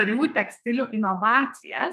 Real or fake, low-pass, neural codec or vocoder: fake; 14.4 kHz; codec, 32 kHz, 1.9 kbps, SNAC